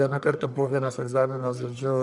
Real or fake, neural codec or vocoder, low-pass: fake; codec, 44.1 kHz, 1.7 kbps, Pupu-Codec; 10.8 kHz